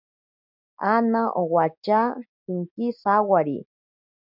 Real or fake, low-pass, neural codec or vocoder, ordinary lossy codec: real; 5.4 kHz; none; MP3, 48 kbps